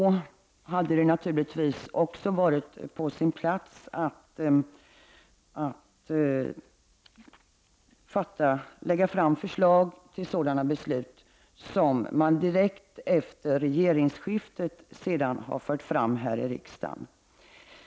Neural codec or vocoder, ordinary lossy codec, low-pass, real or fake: none; none; none; real